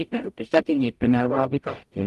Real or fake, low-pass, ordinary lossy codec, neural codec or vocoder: fake; 14.4 kHz; Opus, 32 kbps; codec, 44.1 kHz, 0.9 kbps, DAC